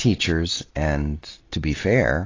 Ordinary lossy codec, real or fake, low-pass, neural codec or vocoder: AAC, 32 kbps; real; 7.2 kHz; none